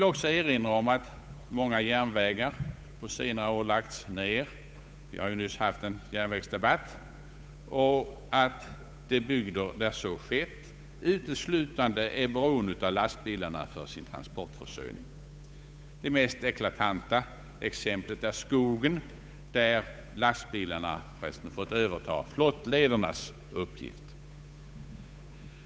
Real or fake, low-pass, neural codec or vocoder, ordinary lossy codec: fake; none; codec, 16 kHz, 8 kbps, FunCodec, trained on Chinese and English, 25 frames a second; none